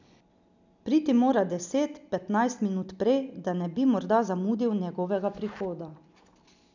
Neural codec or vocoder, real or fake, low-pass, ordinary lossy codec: none; real; 7.2 kHz; none